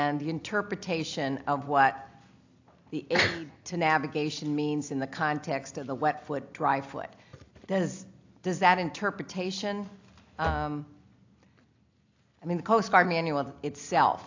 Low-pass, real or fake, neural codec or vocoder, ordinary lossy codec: 7.2 kHz; real; none; AAC, 48 kbps